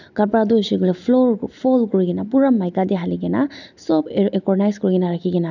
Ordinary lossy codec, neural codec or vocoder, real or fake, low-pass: none; none; real; 7.2 kHz